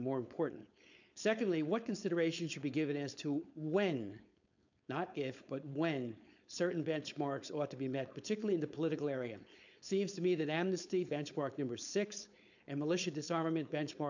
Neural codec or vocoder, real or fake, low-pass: codec, 16 kHz, 4.8 kbps, FACodec; fake; 7.2 kHz